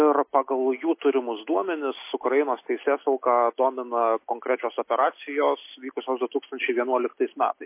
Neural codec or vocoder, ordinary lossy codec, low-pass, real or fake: none; MP3, 24 kbps; 3.6 kHz; real